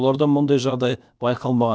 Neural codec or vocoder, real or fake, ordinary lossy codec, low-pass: codec, 16 kHz, about 1 kbps, DyCAST, with the encoder's durations; fake; none; none